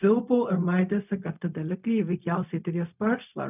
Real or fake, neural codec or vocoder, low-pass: fake; codec, 16 kHz, 0.4 kbps, LongCat-Audio-Codec; 3.6 kHz